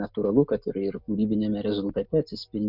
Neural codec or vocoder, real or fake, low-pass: none; real; 5.4 kHz